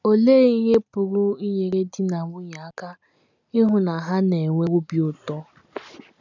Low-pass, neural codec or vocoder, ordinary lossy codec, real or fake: 7.2 kHz; none; none; real